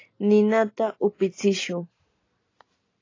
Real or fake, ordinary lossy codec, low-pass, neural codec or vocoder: real; AAC, 32 kbps; 7.2 kHz; none